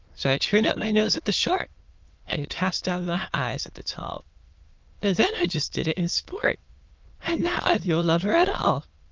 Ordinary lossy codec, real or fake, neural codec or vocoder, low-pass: Opus, 32 kbps; fake; autoencoder, 22.05 kHz, a latent of 192 numbers a frame, VITS, trained on many speakers; 7.2 kHz